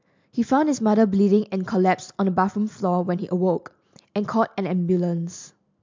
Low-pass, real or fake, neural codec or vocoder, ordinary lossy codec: 7.2 kHz; real; none; MP3, 64 kbps